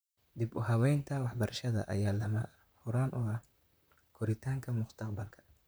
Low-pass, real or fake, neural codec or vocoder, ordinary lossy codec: none; fake; vocoder, 44.1 kHz, 128 mel bands, Pupu-Vocoder; none